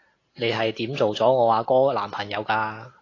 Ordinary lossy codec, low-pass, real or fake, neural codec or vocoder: AAC, 32 kbps; 7.2 kHz; real; none